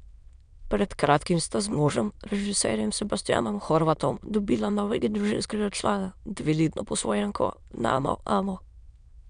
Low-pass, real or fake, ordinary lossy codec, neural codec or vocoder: 9.9 kHz; fake; MP3, 96 kbps; autoencoder, 22.05 kHz, a latent of 192 numbers a frame, VITS, trained on many speakers